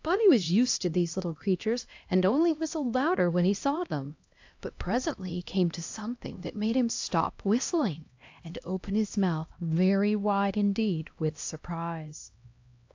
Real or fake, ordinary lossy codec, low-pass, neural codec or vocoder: fake; AAC, 48 kbps; 7.2 kHz; codec, 16 kHz, 1 kbps, X-Codec, HuBERT features, trained on LibriSpeech